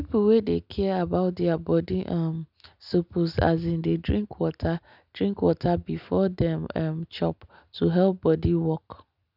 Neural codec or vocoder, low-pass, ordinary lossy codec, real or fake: none; 5.4 kHz; none; real